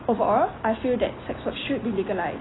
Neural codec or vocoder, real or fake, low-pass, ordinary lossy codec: none; real; 7.2 kHz; AAC, 16 kbps